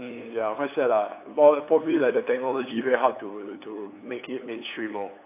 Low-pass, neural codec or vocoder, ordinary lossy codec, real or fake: 3.6 kHz; codec, 16 kHz, 8 kbps, FunCodec, trained on LibriTTS, 25 frames a second; AAC, 24 kbps; fake